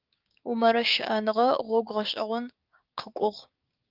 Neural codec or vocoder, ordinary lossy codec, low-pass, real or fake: codec, 16 kHz, 6 kbps, DAC; Opus, 24 kbps; 5.4 kHz; fake